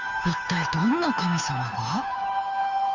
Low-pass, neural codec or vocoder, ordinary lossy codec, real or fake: 7.2 kHz; codec, 16 kHz, 2 kbps, FunCodec, trained on Chinese and English, 25 frames a second; none; fake